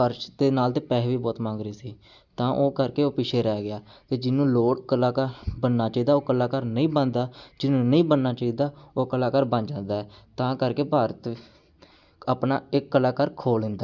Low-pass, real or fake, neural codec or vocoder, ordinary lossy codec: 7.2 kHz; real; none; none